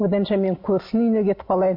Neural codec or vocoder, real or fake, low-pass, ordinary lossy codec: none; real; 5.4 kHz; MP3, 32 kbps